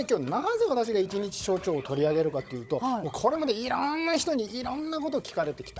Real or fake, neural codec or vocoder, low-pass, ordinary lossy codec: fake; codec, 16 kHz, 16 kbps, FunCodec, trained on Chinese and English, 50 frames a second; none; none